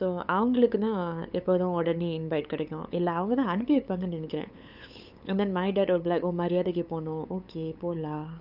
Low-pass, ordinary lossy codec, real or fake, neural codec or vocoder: 5.4 kHz; none; fake; codec, 16 kHz, 8 kbps, FunCodec, trained on LibriTTS, 25 frames a second